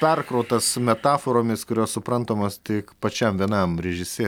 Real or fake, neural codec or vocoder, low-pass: real; none; 19.8 kHz